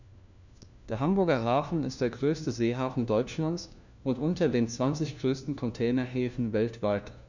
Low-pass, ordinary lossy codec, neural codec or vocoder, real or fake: 7.2 kHz; none; codec, 16 kHz, 1 kbps, FunCodec, trained on LibriTTS, 50 frames a second; fake